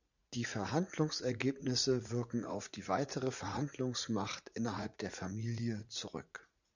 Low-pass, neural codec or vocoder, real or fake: 7.2 kHz; vocoder, 44.1 kHz, 80 mel bands, Vocos; fake